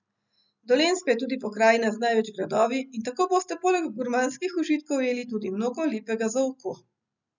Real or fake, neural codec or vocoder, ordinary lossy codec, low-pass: real; none; none; 7.2 kHz